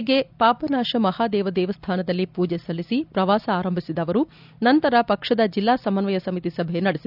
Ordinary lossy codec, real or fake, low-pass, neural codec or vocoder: none; real; 5.4 kHz; none